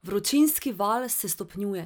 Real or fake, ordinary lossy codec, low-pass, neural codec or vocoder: real; none; none; none